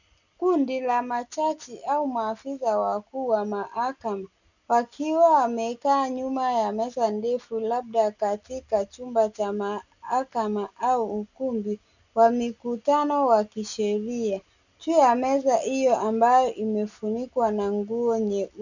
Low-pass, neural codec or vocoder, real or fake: 7.2 kHz; none; real